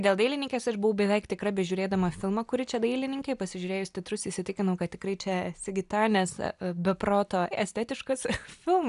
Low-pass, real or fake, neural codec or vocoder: 10.8 kHz; real; none